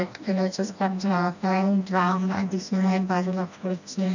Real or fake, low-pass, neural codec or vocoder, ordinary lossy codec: fake; 7.2 kHz; codec, 16 kHz, 1 kbps, FreqCodec, smaller model; none